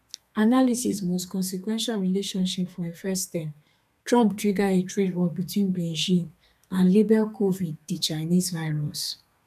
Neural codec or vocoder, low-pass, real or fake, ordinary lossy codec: codec, 32 kHz, 1.9 kbps, SNAC; 14.4 kHz; fake; none